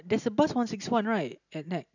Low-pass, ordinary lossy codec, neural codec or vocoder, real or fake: 7.2 kHz; none; none; real